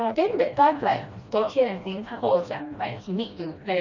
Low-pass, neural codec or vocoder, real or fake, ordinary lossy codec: 7.2 kHz; codec, 16 kHz, 1 kbps, FreqCodec, smaller model; fake; Opus, 64 kbps